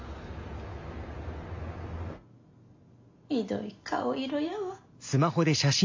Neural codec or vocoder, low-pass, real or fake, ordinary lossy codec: none; 7.2 kHz; real; MP3, 48 kbps